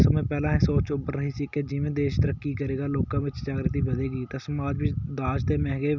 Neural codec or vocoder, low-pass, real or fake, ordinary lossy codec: none; 7.2 kHz; real; none